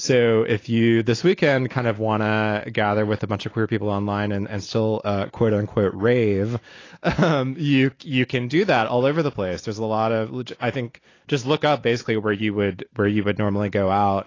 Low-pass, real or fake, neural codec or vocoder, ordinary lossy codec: 7.2 kHz; real; none; AAC, 32 kbps